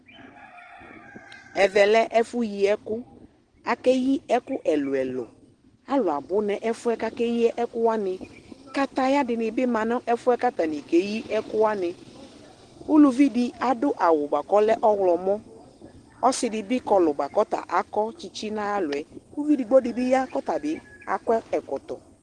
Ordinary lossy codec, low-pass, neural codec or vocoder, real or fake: Opus, 16 kbps; 10.8 kHz; none; real